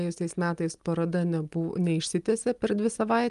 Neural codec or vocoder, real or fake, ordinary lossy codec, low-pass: none; real; Opus, 32 kbps; 10.8 kHz